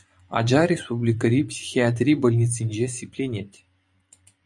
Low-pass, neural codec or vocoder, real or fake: 10.8 kHz; vocoder, 24 kHz, 100 mel bands, Vocos; fake